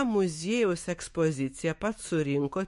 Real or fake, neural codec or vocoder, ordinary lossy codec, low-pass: fake; autoencoder, 48 kHz, 128 numbers a frame, DAC-VAE, trained on Japanese speech; MP3, 48 kbps; 14.4 kHz